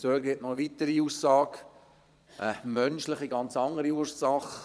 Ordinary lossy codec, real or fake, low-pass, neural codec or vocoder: none; fake; none; vocoder, 22.05 kHz, 80 mel bands, Vocos